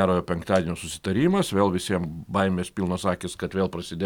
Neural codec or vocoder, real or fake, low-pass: none; real; 19.8 kHz